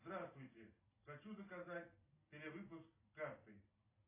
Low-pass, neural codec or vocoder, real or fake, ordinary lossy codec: 3.6 kHz; none; real; AAC, 24 kbps